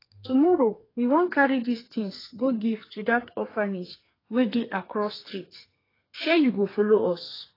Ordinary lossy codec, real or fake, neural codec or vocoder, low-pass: AAC, 24 kbps; fake; codec, 32 kHz, 1.9 kbps, SNAC; 5.4 kHz